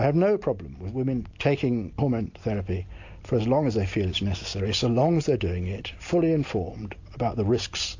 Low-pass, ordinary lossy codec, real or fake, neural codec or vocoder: 7.2 kHz; AAC, 48 kbps; real; none